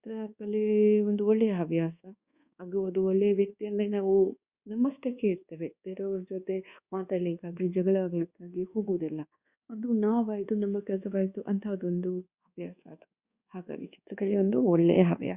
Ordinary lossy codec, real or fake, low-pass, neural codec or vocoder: Opus, 64 kbps; fake; 3.6 kHz; codec, 24 kHz, 1.2 kbps, DualCodec